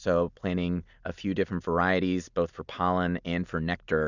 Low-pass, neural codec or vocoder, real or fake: 7.2 kHz; none; real